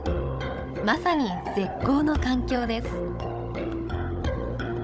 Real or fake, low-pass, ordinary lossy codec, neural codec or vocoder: fake; none; none; codec, 16 kHz, 16 kbps, FunCodec, trained on Chinese and English, 50 frames a second